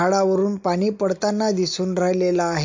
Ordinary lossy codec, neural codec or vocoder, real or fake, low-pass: MP3, 48 kbps; none; real; 7.2 kHz